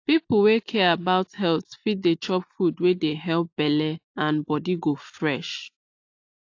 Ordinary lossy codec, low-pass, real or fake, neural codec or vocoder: AAC, 48 kbps; 7.2 kHz; real; none